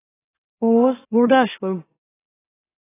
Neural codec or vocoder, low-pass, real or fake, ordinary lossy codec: autoencoder, 44.1 kHz, a latent of 192 numbers a frame, MeloTTS; 3.6 kHz; fake; AAC, 16 kbps